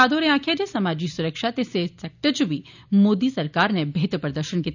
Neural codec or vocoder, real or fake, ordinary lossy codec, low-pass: none; real; none; 7.2 kHz